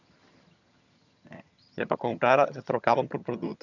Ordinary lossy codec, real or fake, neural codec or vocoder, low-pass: none; fake; vocoder, 22.05 kHz, 80 mel bands, HiFi-GAN; 7.2 kHz